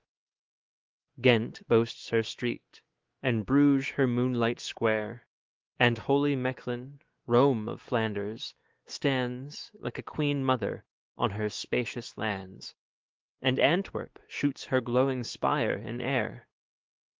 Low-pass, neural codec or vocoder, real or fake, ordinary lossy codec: 7.2 kHz; autoencoder, 48 kHz, 128 numbers a frame, DAC-VAE, trained on Japanese speech; fake; Opus, 16 kbps